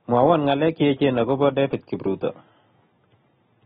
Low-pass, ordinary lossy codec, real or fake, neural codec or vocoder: 19.8 kHz; AAC, 16 kbps; real; none